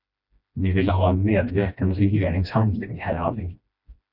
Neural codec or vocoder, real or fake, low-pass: codec, 16 kHz, 1 kbps, FreqCodec, smaller model; fake; 5.4 kHz